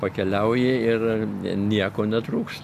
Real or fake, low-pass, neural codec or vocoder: real; 14.4 kHz; none